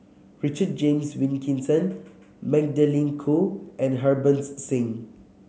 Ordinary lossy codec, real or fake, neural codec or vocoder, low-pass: none; real; none; none